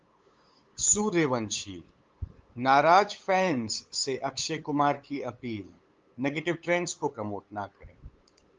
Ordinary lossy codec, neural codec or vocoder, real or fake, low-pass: Opus, 32 kbps; codec, 16 kHz, 8 kbps, FunCodec, trained on LibriTTS, 25 frames a second; fake; 7.2 kHz